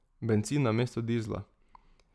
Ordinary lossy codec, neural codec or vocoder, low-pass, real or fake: none; none; none; real